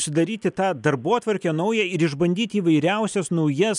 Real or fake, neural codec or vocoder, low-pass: real; none; 10.8 kHz